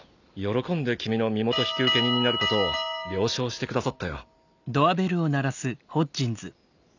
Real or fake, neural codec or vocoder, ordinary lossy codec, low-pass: real; none; none; 7.2 kHz